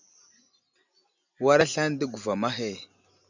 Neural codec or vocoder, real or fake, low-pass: none; real; 7.2 kHz